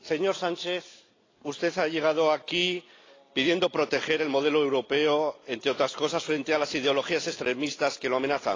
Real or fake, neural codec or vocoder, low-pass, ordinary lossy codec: real; none; 7.2 kHz; AAC, 32 kbps